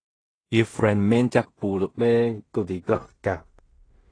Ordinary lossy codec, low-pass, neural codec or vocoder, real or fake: AAC, 32 kbps; 9.9 kHz; codec, 16 kHz in and 24 kHz out, 0.4 kbps, LongCat-Audio-Codec, two codebook decoder; fake